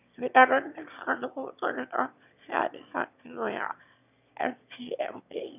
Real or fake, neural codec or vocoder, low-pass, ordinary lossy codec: fake; autoencoder, 22.05 kHz, a latent of 192 numbers a frame, VITS, trained on one speaker; 3.6 kHz; none